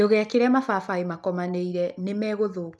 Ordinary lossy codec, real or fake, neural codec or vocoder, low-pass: none; real; none; none